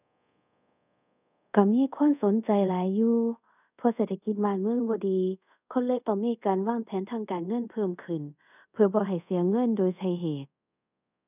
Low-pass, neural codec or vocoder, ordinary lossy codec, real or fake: 3.6 kHz; codec, 24 kHz, 0.5 kbps, DualCodec; none; fake